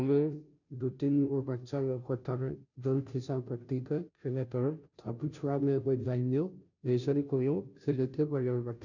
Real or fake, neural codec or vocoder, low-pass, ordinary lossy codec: fake; codec, 16 kHz, 0.5 kbps, FunCodec, trained on Chinese and English, 25 frames a second; 7.2 kHz; none